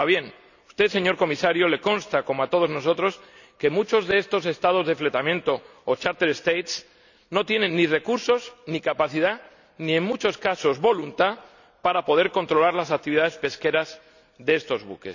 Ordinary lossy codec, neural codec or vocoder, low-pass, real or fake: none; none; 7.2 kHz; real